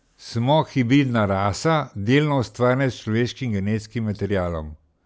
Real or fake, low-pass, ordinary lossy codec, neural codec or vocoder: real; none; none; none